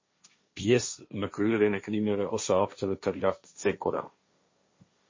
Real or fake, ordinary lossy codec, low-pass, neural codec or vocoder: fake; MP3, 32 kbps; 7.2 kHz; codec, 16 kHz, 1.1 kbps, Voila-Tokenizer